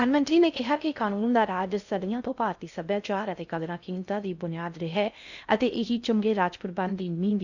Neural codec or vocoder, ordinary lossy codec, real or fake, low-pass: codec, 16 kHz in and 24 kHz out, 0.6 kbps, FocalCodec, streaming, 4096 codes; none; fake; 7.2 kHz